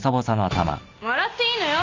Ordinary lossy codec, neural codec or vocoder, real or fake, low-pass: MP3, 64 kbps; none; real; 7.2 kHz